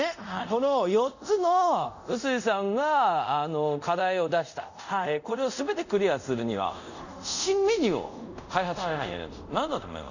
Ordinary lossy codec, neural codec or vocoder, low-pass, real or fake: none; codec, 24 kHz, 0.5 kbps, DualCodec; 7.2 kHz; fake